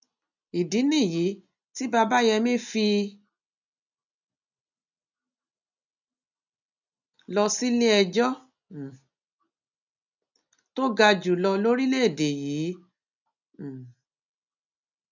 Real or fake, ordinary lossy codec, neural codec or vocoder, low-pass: real; none; none; 7.2 kHz